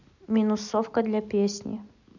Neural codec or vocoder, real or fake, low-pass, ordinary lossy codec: none; real; 7.2 kHz; none